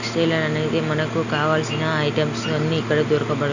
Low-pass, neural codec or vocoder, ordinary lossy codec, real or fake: 7.2 kHz; none; none; real